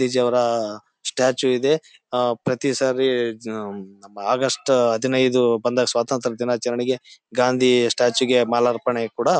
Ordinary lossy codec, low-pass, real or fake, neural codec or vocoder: none; none; real; none